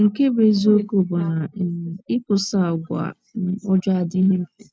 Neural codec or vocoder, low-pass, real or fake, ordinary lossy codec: none; none; real; none